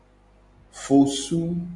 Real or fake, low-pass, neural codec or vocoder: real; 10.8 kHz; none